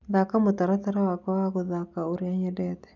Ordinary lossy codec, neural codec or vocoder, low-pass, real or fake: none; none; 7.2 kHz; real